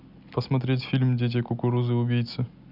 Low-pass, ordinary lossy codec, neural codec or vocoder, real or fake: 5.4 kHz; none; none; real